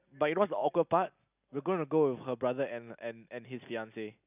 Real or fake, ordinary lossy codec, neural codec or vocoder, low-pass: real; none; none; 3.6 kHz